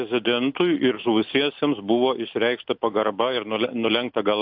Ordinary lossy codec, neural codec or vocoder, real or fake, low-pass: AAC, 48 kbps; none; real; 5.4 kHz